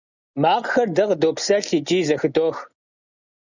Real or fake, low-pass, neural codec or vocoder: real; 7.2 kHz; none